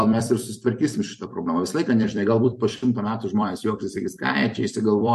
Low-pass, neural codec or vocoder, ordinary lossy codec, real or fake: 14.4 kHz; vocoder, 44.1 kHz, 128 mel bands every 256 samples, BigVGAN v2; MP3, 64 kbps; fake